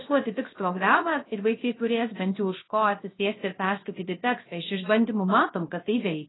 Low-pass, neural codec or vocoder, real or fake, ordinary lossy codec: 7.2 kHz; codec, 16 kHz, 0.3 kbps, FocalCodec; fake; AAC, 16 kbps